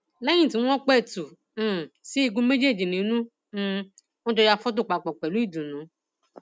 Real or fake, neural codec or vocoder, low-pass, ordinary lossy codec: real; none; none; none